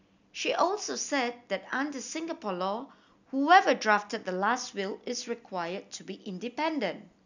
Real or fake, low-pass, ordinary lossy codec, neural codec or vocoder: real; 7.2 kHz; none; none